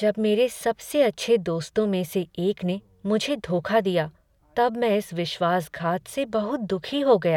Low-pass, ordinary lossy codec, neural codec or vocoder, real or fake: 19.8 kHz; none; none; real